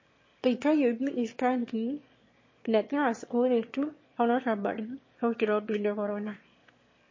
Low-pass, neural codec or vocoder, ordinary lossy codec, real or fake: 7.2 kHz; autoencoder, 22.05 kHz, a latent of 192 numbers a frame, VITS, trained on one speaker; MP3, 32 kbps; fake